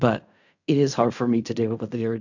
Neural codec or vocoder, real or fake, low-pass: codec, 16 kHz in and 24 kHz out, 0.4 kbps, LongCat-Audio-Codec, fine tuned four codebook decoder; fake; 7.2 kHz